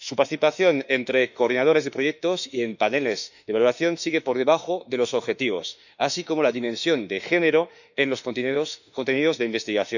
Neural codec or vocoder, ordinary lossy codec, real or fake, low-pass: autoencoder, 48 kHz, 32 numbers a frame, DAC-VAE, trained on Japanese speech; none; fake; 7.2 kHz